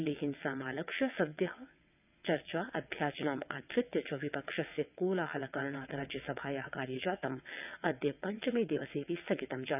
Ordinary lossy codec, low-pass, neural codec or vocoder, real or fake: none; 3.6 kHz; vocoder, 22.05 kHz, 80 mel bands, WaveNeXt; fake